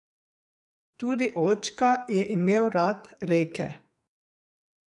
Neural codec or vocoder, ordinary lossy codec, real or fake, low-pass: codec, 44.1 kHz, 2.6 kbps, SNAC; none; fake; 10.8 kHz